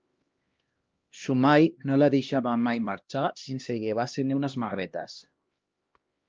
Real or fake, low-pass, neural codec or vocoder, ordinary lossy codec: fake; 7.2 kHz; codec, 16 kHz, 1 kbps, X-Codec, HuBERT features, trained on LibriSpeech; Opus, 24 kbps